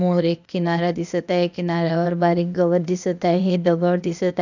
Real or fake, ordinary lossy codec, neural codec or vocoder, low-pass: fake; none; codec, 16 kHz, 0.8 kbps, ZipCodec; 7.2 kHz